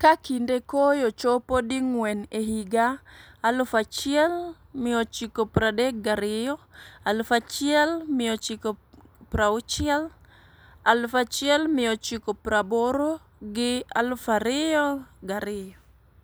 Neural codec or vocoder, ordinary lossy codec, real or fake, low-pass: none; none; real; none